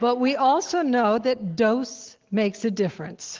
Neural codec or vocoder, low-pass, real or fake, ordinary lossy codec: none; 7.2 kHz; real; Opus, 16 kbps